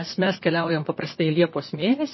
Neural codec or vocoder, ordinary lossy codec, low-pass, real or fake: vocoder, 44.1 kHz, 128 mel bands, Pupu-Vocoder; MP3, 24 kbps; 7.2 kHz; fake